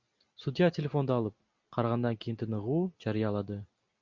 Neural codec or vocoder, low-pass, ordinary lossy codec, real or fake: none; 7.2 kHz; Opus, 64 kbps; real